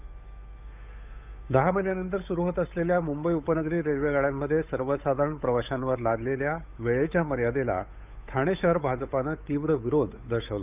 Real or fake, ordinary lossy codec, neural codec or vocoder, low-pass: fake; none; codec, 16 kHz, 8 kbps, FunCodec, trained on Chinese and English, 25 frames a second; 3.6 kHz